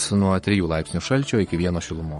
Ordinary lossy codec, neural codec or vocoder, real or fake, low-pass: MP3, 48 kbps; codec, 44.1 kHz, 7.8 kbps, Pupu-Codec; fake; 19.8 kHz